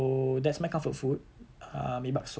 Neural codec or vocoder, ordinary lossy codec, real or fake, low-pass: none; none; real; none